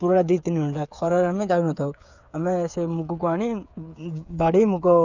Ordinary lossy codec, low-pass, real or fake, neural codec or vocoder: none; 7.2 kHz; fake; codec, 16 kHz, 8 kbps, FreqCodec, smaller model